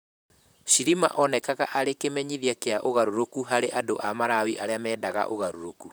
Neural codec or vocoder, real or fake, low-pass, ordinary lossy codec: vocoder, 44.1 kHz, 128 mel bands, Pupu-Vocoder; fake; none; none